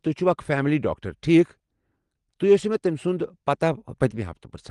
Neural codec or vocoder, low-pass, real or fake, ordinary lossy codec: none; 10.8 kHz; real; Opus, 24 kbps